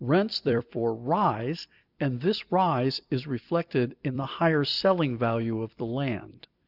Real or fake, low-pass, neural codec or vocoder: fake; 5.4 kHz; vocoder, 44.1 kHz, 128 mel bands every 256 samples, BigVGAN v2